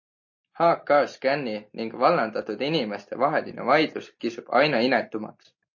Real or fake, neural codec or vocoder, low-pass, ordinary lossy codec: real; none; 7.2 kHz; MP3, 32 kbps